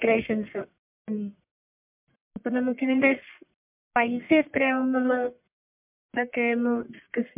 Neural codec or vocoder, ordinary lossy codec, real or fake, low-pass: codec, 44.1 kHz, 1.7 kbps, Pupu-Codec; MP3, 32 kbps; fake; 3.6 kHz